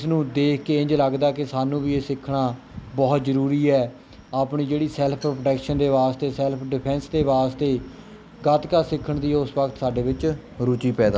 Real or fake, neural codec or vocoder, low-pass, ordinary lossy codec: real; none; none; none